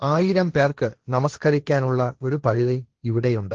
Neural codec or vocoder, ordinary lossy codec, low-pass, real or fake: codec, 16 kHz, 1.1 kbps, Voila-Tokenizer; Opus, 16 kbps; 7.2 kHz; fake